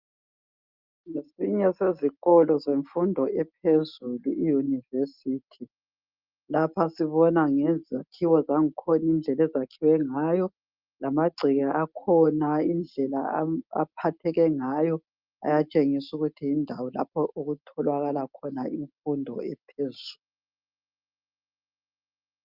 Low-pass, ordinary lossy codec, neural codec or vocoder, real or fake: 5.4 kHz; Opus, 24 kbps; none; real